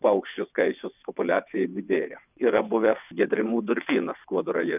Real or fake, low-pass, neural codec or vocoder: fake; 3.6 kHz; vocoder, 22.05 kHz, 80 mel bands, WaveNeXt